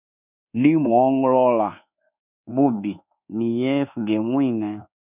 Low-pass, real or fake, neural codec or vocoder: 3.6 kHz; fake; codec, 24 kHz, 1.2 kbps, DualCodec